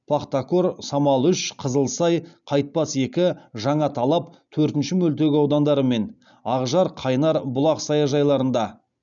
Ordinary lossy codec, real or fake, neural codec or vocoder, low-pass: none; real; none; 7.2 kHz